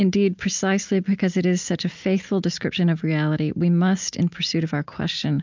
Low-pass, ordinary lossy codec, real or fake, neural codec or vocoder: 7.2 kHz; MP3, 64 kbps; real; none